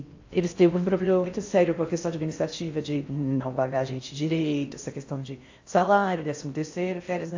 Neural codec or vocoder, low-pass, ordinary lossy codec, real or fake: codec, 16 kHz in and 24 kHz out, 0.6 kbps, FocalCodec, streaming, 4096 codes; 7.2 kHz; AAC, 48 kbps; fake